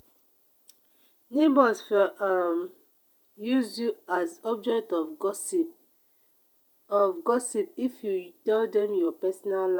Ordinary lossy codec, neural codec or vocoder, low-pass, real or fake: none; vocoder, 48 kHz, 128 mel bands, Vocos; none; fake